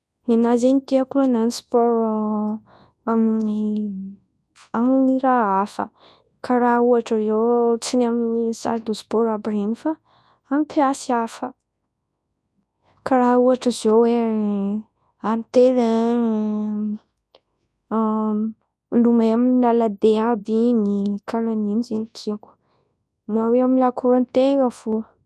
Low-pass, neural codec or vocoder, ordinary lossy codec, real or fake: none; codec, 24 kHz, 0.9 kbps, WavTokenizer, large speech release; none; fake